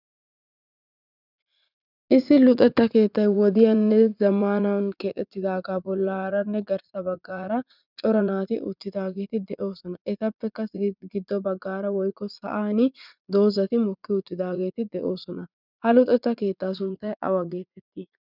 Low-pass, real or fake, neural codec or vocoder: 5.4 kHz; fake; vocoder, 44.1 kHz, 80 mel bands, Vocos